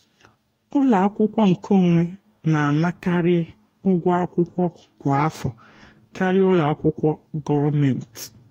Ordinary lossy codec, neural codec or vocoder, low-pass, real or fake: AAC, 48 kbps; codec, 44.1 kHz, 2.6 kbps, DAC; 19.8 kHz; fake